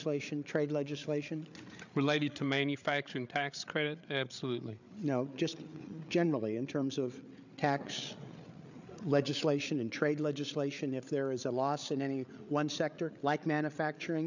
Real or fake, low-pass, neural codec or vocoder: fake; 7.2 kHz; codec, 16 kHz, 8 kbps, FreqCodec, larger model